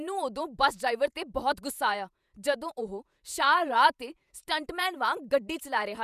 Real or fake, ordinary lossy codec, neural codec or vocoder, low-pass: fake; none; vocoder, 48 kHz, 128 mel bands, Vocos; 14.4 kHz